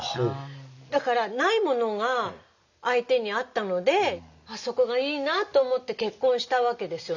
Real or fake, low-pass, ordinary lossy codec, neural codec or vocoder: real; 7.2 kHz; none; none